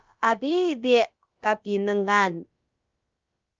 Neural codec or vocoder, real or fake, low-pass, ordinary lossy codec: codec, 16 kHz, about 1 kbps, DyCAST, with the encoder's durations; fake; 7.2 kHz; Opus, 24 kbps